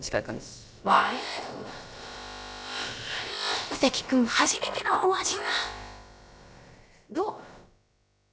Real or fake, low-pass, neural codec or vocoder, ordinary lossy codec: fake; none; codec, 16 kHz, about 1 kbps, DyCAST, with the encoder's durations; none